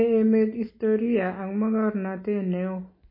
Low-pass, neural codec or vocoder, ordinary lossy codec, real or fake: 5.4 kHz; none; MP3, 24 kbps; real